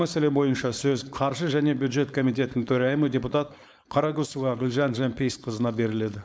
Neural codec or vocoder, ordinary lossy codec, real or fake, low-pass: codec, 16 kHz, 4.8 kbps, FACodec; none; fake; none